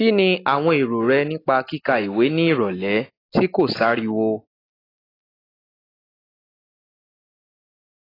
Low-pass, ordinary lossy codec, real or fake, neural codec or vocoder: 5.4 kHz; AAC, 24 kbps; real; none